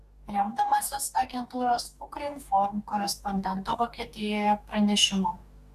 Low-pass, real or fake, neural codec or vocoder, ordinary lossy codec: 14.4 kHz; fake; codec, 44.1 kHz, 2.6 kbps, DAC; AAC, 96 kbps